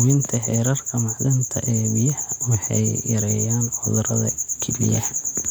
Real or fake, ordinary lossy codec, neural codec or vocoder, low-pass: real; none; none; 19.8 kHz